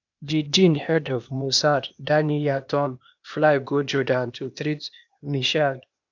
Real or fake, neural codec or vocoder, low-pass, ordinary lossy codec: fake; codec, 16 kHz, 0.8 kbps, ZipCodec; 7.2 kHz; none